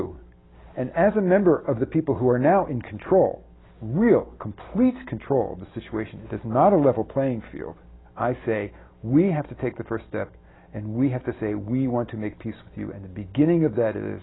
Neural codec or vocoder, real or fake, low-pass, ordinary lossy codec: none; real; 7.2 kHz; AAC, 16 kbps